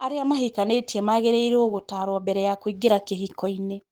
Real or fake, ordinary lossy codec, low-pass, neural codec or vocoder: fake; Opus, 24 kbps; 19.8 kHz; codec, 44.1 kHz, 7.8 kbps, Pupu-Codec